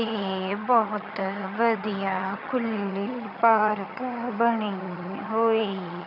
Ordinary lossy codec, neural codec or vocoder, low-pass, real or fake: none; vocoder, 22.05 kHz, 80 mel bands, HiFi-GAN; 5.4 kHz; fake